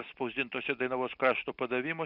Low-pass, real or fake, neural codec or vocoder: 5.4 kHz; real; none